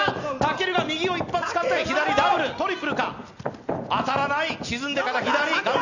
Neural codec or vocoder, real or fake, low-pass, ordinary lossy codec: none; real; 7.2 kHz; none